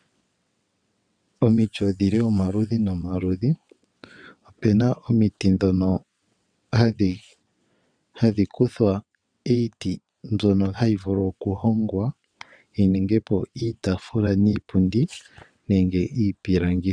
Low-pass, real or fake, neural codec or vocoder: 9.9 kHz; fake; vocoder, 22.05 kHz, 80 mel bands, WaveNeXt